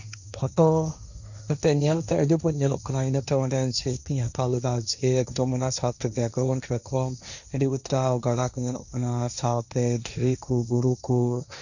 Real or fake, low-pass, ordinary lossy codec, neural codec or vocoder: fake; 7.2 kHz; none; codec, 16 kHz, 1.1 kbps, Voila-Tokenizer